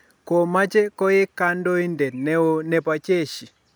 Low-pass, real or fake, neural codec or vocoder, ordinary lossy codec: none; real; none; none